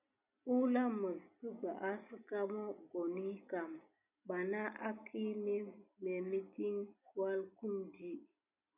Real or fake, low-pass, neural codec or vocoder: real; 3.6 kHz; none